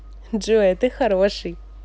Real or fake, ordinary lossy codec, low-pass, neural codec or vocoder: real; none; none; none